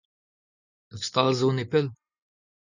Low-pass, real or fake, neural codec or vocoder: 7.2 kHz; real; none